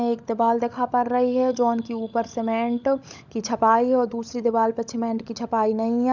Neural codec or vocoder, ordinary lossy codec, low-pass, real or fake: codec, 16 kHz, 16 kbps, FunCodec, trained on LibriTTS, 50 frames a second; none; 7.2 kHz; fake